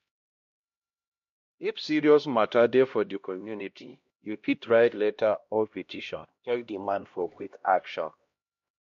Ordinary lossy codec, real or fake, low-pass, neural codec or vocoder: MP3, 48 kbps; fake; 7.2 kHz; codec, 16 kHz, 1 kbps, X-Codec, HuBERT features, trained on LibriSpeech